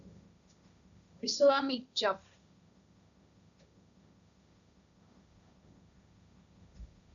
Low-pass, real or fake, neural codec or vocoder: 7.2 kHz; fake; codec, 16 kHz, 1.1 kbps, Voila-Tokenizer